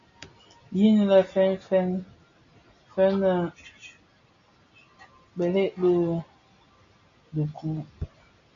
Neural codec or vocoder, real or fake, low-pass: none; real; 7.2 kHz